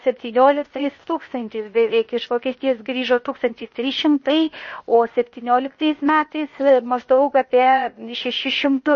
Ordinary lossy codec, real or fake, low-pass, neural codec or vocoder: MP3, 32 kbps; fake; 7.2 kHz; codec, 16 kHz, 0.8 kbps, ZipCodec